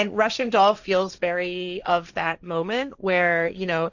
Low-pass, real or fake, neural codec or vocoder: 7.2 kHz; fake; codec, 16 kHz, 1.1 kbps, Voila-Tokenizer